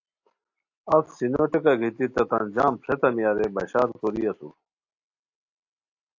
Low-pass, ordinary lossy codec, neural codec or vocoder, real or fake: 7.2 kHz; AAC, 48 kbps; none; real